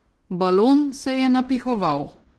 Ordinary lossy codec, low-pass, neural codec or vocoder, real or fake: Opus, 16 kbps; 10.8 kHz; codec, 16 kHz in and 24 kHz out, 0.9 kbps, LongCat-Audio-Codec, fine tuned four codebook decoder; fake